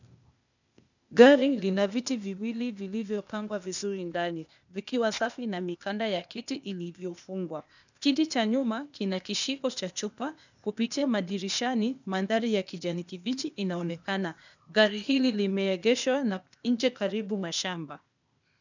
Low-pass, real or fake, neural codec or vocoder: 7.2 kHz; fake; codec, 16 kHz, 0.8 kbps, ZipCodec